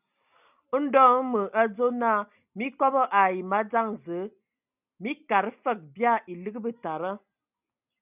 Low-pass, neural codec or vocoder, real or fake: 3.6 kHz; none; real